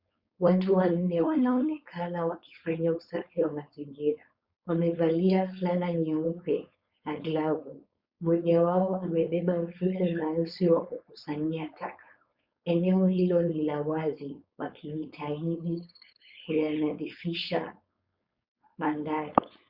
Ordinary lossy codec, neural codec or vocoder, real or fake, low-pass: Opus, 64 kbps; codec, 16 kHz, 4.8 kbps, FACodec; fake; 5.4 kHz